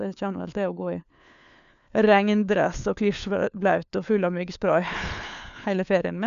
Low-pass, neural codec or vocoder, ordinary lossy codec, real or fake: 7.2 kHz; codec, 16 kHz, 4 kbps, FunCodec, trained on LibriTTS, 50 frames a second; none; fake